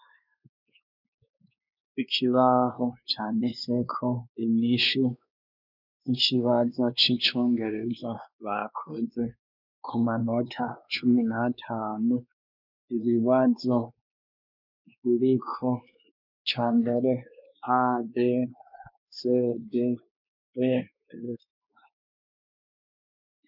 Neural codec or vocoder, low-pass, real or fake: codec, 16 kHz, 2 kbps, X-Codec, WavLM features, trained on Multilingual LibriSpeech; 5.4 kHz; fake